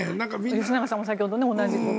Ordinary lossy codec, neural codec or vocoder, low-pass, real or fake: none; none; none; real